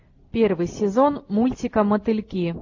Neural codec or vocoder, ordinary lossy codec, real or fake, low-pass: none; AAC, 48 kbps; real; 7.2 kHz